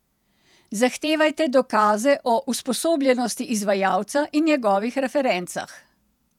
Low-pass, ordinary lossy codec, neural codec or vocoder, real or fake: 19.8 kHz; none; vocoder, 48 kHz, 128 mel bands, Vocos; fake